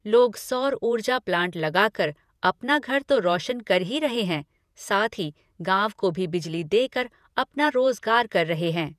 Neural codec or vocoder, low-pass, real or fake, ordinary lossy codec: vocoder, 48 kHz, 128 mel bands, Vocos; 14.4 kHz; fake; none